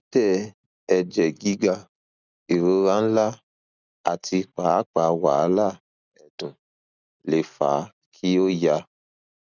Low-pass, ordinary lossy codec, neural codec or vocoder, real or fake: 7.2 kHz; none; none; real